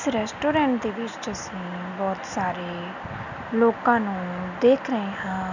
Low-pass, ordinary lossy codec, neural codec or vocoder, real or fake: 7.2 kHz; none; none; real